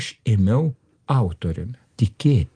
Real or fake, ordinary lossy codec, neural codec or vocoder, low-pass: real; Opus, 24 kbps; none; 9.9 kHz